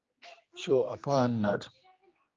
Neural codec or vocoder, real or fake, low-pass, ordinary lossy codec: codec, 16 kHz, 2 kbps, X-Codec, HuBERT features, trained on general audio; fake; 7.2 kHz; Opus, 24 kbps